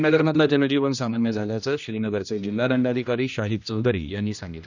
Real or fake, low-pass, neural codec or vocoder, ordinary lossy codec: fake; 7.2 kHz; codec, 16 kHz, 1 kbps, X-Codec, HuBERT features, trained on general audio; none